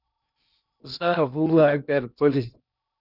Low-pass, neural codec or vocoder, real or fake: 5.4 kHz; codec, 16 kHz in and 24 kHz out, 0.6 kbps, FocalCodec, streaming, 2048 codes; fake